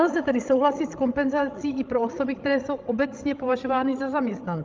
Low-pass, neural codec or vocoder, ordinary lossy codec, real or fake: 7.2 kHz; codec, 16 kHz, 16 kbps, FreqCodec, larger model; Opus, 32 kbps; fake